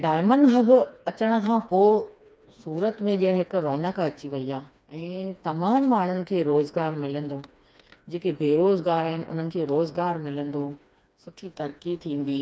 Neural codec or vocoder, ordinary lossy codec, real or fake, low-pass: codec, 16 kHz, 2 kbps, FreqCodec, smaller model; none; fake; none